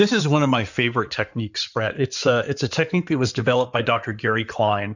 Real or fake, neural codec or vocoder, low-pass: fake; codec, 16 kHz in and 24 kHz out, 2.2 kbps, FireRedTTS-2 codec; 7.2 kHz